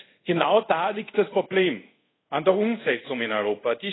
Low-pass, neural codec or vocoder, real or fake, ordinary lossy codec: 7.2 kHz; codec, 24 kHz, 0.5 kbps, DualCodec; fake; AAC, 16 kbps